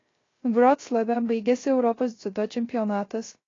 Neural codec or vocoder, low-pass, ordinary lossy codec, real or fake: codec, 16 kHz, 0.3 kbps, FocalCodec; 7.2 kHz; AAC, 32 kbps; fake